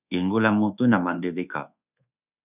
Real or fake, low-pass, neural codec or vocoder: fake; 3.6 kHz; codec, 24 kHz, 1.2 kbps, DualCodec